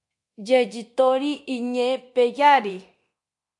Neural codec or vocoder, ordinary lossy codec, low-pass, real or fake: codec, 24 kHz, 0.9 kbps, DualCodec; MP3, 64 kbps; 10.8 kHz; fake